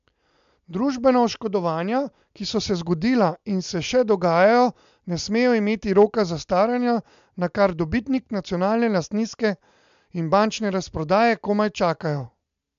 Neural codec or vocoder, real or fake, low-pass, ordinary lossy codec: none; real; 7.2 kHz; MP3, 64 kbps